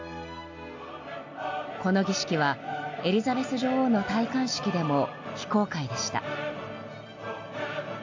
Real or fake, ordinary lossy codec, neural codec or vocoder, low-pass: real; AAC, 48 kbps; none; 7.2 kHz